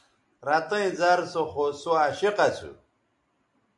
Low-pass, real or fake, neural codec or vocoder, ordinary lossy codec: 10.8 kHz; real; none; AAC, 64 kbps